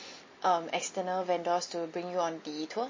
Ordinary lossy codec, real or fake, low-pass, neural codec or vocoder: MP3, 32 kbps; real; 7.2 kHz; none